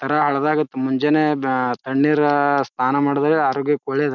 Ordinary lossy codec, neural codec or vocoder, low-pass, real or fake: none; none; 7.2 kHz; real